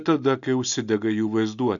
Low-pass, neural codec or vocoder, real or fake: 7.2 kHz; none; real